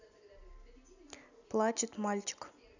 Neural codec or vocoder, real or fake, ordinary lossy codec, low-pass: none; real; none; 7.2 kHz